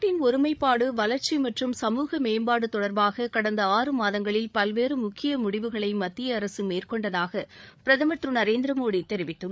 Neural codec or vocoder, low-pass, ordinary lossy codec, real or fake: codec, 16 kHz, 8 kbps, FreqCodec, larger model; none; none; fake